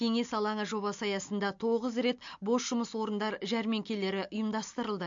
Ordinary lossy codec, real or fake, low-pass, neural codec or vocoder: MP3, 48 kbps; real; 7.2 kHz; none